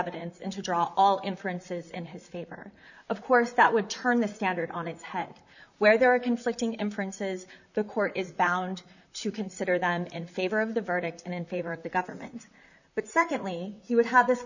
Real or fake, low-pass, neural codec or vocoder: fake; 7.2 kHz; vocoder, 44.1 kHz, 128 mel bands, Pupu-Vocoder